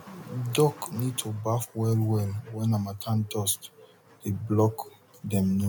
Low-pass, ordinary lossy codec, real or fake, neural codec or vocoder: 19.8 kHz; MP3, 96 kbps; real; none